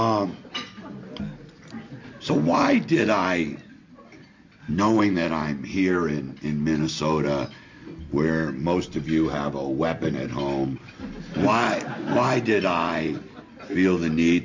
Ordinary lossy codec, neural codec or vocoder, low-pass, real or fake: MP3, 48 kbps; none; 7.2 kHz; real